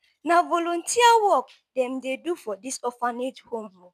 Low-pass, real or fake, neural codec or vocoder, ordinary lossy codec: 14.4 kHz; real; none; none